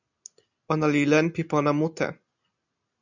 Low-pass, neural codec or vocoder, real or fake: 7.2 kHz; none; real